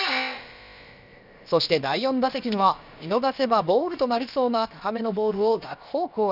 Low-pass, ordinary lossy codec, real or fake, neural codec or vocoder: 5.4 kHz; none; fake; codec, 16 kHz, about 1 kbps, DyCAST, with the encoder's durations